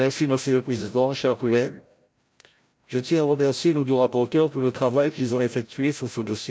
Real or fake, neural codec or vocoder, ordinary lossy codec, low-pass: fake; codec, 16 kHz, 0.5 kbps, FreqCodec, larger model; none; none